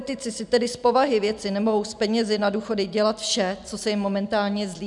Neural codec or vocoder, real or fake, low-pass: none; real; 10.8 kHz